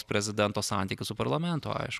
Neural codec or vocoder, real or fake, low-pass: none; real; 14.4 kHz